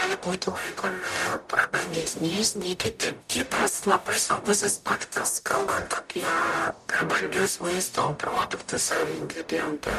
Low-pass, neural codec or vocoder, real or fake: 14.4 kHz; codec, 44.1 kHz, 0.9 kbps, DAC; fake